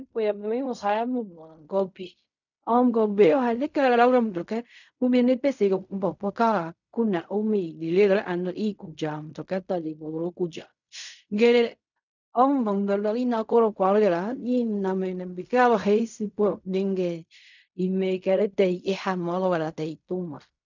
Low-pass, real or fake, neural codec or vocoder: 7.2 kHz; fake; codec, 16 kHz in and 24 kHz out, 0.4 kbps, LongCat-Audio-Codec, fine tuned four codebook decoder